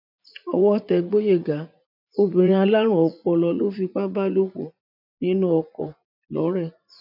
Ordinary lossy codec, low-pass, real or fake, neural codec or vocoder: none; 5.4 kHz; fake; vocoder, 44.1 kHz, 80 mel bands, Vocos